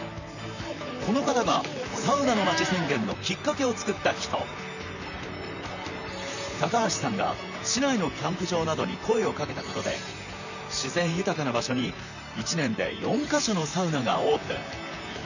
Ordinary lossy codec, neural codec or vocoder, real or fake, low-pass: none; vocoder, 44.1 kHz, 128 mel bands, Pupu-Vocoder; fake; 7.2 kHz